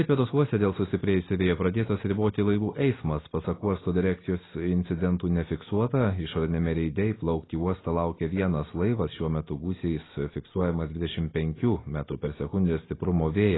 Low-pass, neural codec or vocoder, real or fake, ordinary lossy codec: 7.2 kHz; none; real; AAC, 16 kbps